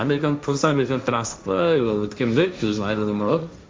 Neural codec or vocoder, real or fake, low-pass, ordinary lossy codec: codec, 16 kHz, 1.1 kbps, Voila-Tokenizer; fake; none; none